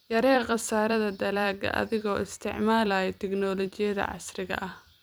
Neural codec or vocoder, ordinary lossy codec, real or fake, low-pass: vocoder, 44.1 kHz, 128 mel bands every 256 samples, BigVGAN v2; none; fake; none